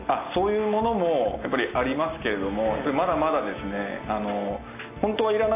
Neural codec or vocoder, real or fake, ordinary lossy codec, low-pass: none; real; AAC, 24 kbps; 3.6 kHz